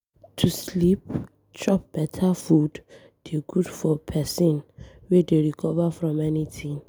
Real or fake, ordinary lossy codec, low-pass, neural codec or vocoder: real; none; none; none